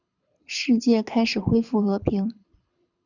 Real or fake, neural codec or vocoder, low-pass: fake; codec, 24 kHz, 6 kbps, HILCodec; 7.2 kHz